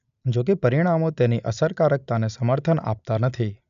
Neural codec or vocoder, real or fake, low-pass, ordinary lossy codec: none; real; 7.2 kHz; none